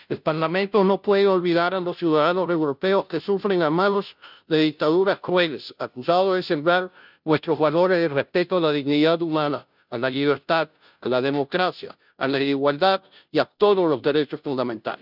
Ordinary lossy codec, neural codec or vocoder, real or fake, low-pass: none; codec, 16 kHz, 0.5 kbps, FunCodec, trained on Chinese and English, 25 frames a second; fake; 5.4 kHz